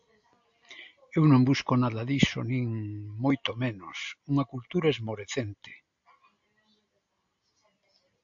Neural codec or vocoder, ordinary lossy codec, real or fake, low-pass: none; AAC, 64 kbps; real; 7.2 kHz